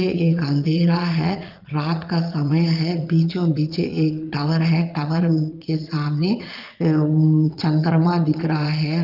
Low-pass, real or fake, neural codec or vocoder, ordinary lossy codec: 5.4 kHz; fake; vocoder, 22.05 kHz, 80 mel bands, Vocos; Opus, 32 kbps